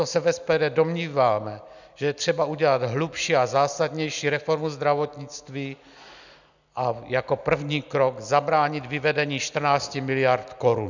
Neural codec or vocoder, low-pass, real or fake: none; 7.2 kHz; real